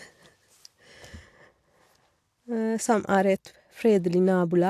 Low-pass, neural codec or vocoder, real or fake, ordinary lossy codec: 14.4 kHz; none; real; AAC, 64 kbps